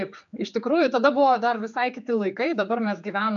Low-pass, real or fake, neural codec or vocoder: 7.2 kHz; fake; codec, 16 kHz, 4 kbps, X-Codec, HuBERT features, trained on general audio